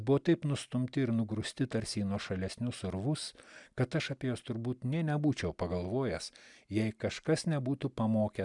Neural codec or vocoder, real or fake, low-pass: none; real; 10.8 kHz